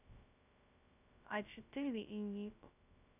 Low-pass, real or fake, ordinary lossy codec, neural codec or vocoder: 3.6 kHz; fake; none; codec, 16 kHz, 0.2 kbps, FocalCodec